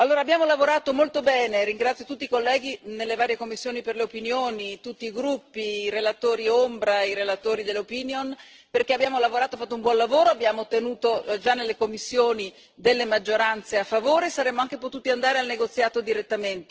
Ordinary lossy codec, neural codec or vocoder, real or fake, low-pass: Opus, 16 kbps; none; real; 7.2 kHz